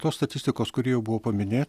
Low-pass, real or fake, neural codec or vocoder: 14.4 kHz; real; none